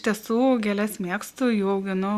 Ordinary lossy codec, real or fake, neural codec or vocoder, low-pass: Opus, 64 kbps; real; none; 14.4 kHz